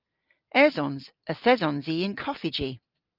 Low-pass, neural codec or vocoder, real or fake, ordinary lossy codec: 5.4 kHz; none; real; Opus, 24 kbps